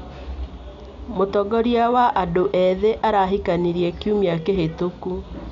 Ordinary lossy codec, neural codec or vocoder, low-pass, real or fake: none; none; 7.2 kHz; real